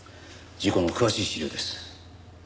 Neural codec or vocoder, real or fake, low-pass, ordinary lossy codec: none; real; none; none